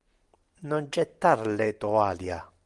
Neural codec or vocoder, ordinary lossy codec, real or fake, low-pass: none; Opus, 24 kbps; real; 10.8 kHz